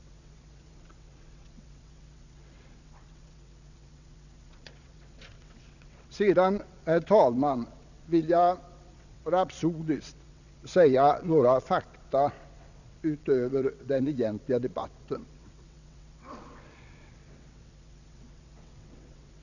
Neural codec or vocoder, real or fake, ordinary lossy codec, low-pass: vocoder, 22.05 kHz, 80 mel bands, WaveNeXt; fake; none; 7.2 kHz